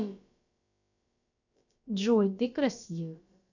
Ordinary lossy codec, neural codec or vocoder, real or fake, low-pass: none; codec, 16 kHz, about 1 kbps, DyCAST, with the encoder's durations; fake; 7.2 kHz